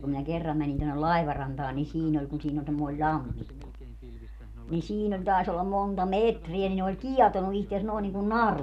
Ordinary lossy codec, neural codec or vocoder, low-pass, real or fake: none; none; 14.4 kHz; real